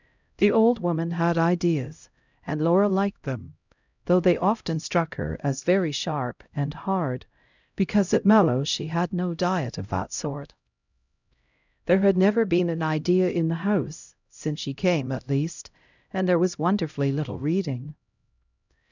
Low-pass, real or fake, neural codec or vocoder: 7.2 kHz; fake; codec, 16 kHz, 0.5 kbps, X-Codec, HuBERT features, trained on LibriSpeech